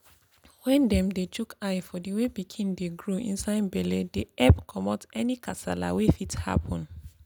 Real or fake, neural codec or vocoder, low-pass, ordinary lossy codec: real; none; none; none